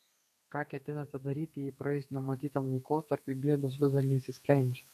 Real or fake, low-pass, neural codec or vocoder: fake; 14.4 kHz; codec, 32 kHz, 1.9 kbps, SNAC